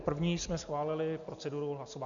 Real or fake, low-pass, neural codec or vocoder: real; 7.2 kHz; none